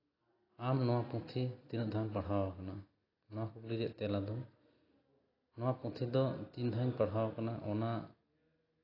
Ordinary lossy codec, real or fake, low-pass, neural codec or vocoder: AAC, 24 kbps; real; 5.4 kHz; none